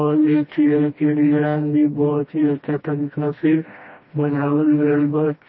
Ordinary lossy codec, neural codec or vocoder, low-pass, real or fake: MP3, 24 kbps; codec, 16 kHz, 1 kbps, FreqCodec, smaller model; 7.2 kHz; fake